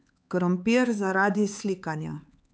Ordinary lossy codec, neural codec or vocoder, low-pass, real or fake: none; codec, 16 kHz, 4 kbps, X-Codec, HuBERT features, trained on LibriSpeech; none; fake